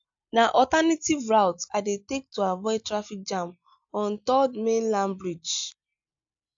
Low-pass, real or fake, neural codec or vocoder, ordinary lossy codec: 7.2 kHz; real; none; AAC, 64 kbps